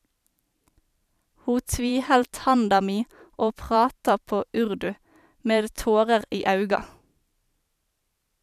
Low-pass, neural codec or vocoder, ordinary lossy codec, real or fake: 14.4 kHz; vocoder, 44.1 kHz, 128 mel bands every 512 samples, BigVGAN v2; none; fake